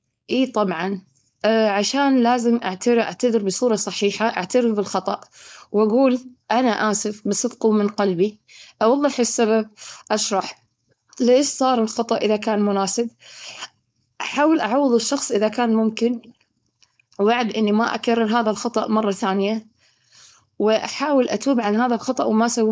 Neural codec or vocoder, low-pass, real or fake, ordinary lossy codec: codec, 16 kHz, 4.8 kbps, FACodec; none; fake; none